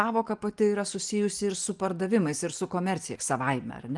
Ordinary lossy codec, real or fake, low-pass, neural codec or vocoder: Opus, 24 kbps; real; 10.8 kHz; none